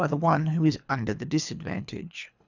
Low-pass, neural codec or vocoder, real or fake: 7.2 kHz; codec, 24 kHz, 3 kbps, HILCodec; fake